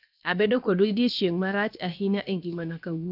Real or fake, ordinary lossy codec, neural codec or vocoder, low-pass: fake; none; codec, 16 kHz, about 1 kbps, DyCAST, with the encoder's durations; 5.4 kHz